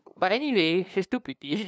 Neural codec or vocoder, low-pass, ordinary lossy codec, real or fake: codec, 16 kHz, 2 kbps, FunCodec, trained on LibriTTS, 25 frames a second; none; none; fake